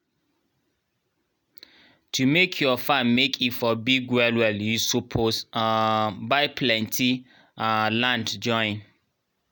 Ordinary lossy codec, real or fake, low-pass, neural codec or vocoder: none; real; none; none